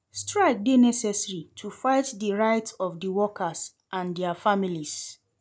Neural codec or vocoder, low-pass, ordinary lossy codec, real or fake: none; none; none; real